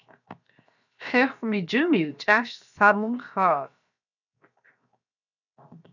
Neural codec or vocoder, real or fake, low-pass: codec, 16 kHz, 0.7 kbps, FocalCodec; fake; 7.2 kHz